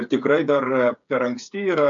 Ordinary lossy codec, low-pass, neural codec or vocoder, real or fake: MP3, 64 kbps; 7.2 kHz; codec, 16 kHz, 8 kbps, FreqCodec, smaller model; fake